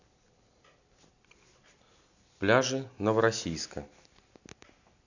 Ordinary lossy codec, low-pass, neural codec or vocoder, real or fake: none; 7.2 kHz; none; real